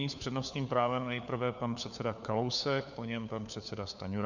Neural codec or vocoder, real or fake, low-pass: codec, 16 kHz, 4 kbps, FunCodec, trained on LibriTTS, 50 frames a second; fake; 7.2 kHz